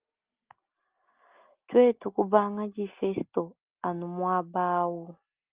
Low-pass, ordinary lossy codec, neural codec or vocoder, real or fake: 3.6 kHz; Opus, 24 kbps; none; real